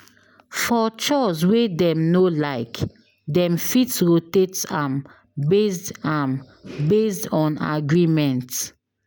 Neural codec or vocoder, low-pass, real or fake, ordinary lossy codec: none; none; real; none